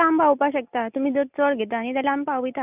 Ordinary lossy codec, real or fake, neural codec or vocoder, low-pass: none; real; none; 3.6 kHz